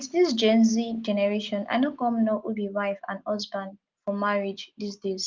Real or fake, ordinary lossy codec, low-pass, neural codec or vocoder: real; Opus, 32 kbps; 7.2 kHz; none